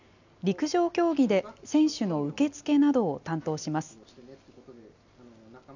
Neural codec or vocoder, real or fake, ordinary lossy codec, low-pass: none; real; none; 7.2 kHz